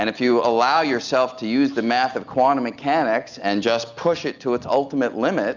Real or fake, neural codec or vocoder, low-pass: real; none; 7.2 kHz